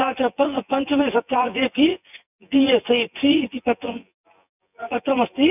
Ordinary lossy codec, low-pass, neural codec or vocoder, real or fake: none; 3.6 kHz; vocoder, 24 kHz, 100 mel bands, Vocos; fake